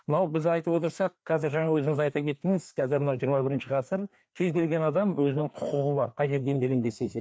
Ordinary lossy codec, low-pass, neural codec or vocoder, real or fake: none; none; codec, 16 kHz, 2 kbps, FreqCodec, larger model; fake